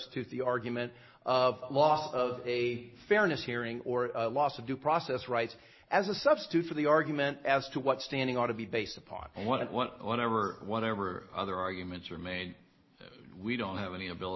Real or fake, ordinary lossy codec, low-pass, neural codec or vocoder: real; MP3, 24 kbps; 7.2 kHz; none